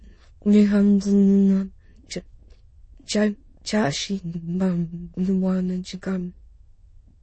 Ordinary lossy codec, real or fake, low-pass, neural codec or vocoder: MP3, 32 kbps; fake; 9.9 kHz; autoencoder, 22.05 kHz, a latent of 192 numbers a frame, VITS, trained on many speakers